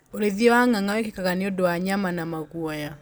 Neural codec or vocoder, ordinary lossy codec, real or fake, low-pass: none; none; real; none